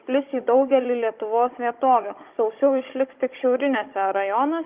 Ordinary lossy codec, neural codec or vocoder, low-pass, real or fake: Opus, 32 kbps; codec, 16 kHz, 16 kbps, FunCodec, trained on Chinese and English, 50 frames a second; 3.6 kHz; fake